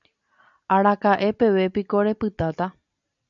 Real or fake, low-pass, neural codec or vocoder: real; 7.2 kHz; none